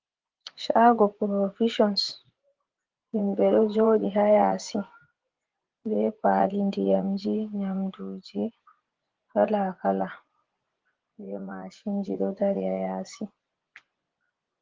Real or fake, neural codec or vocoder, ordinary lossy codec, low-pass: fake; vocoder, 24 kHz, 100 mel bands, Vocos; Opus, 16 kbps; 7.2 kHz